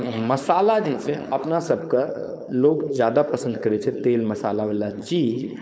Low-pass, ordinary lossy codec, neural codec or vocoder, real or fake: none; none; codec, 16 kHz, 4.8 kbps, FACodec; fake